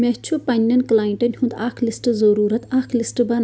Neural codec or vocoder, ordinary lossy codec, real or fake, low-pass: none; none; real; none